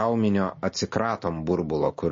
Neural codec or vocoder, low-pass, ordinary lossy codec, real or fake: none; 7.2 kHz; MP3, 32 kbps; real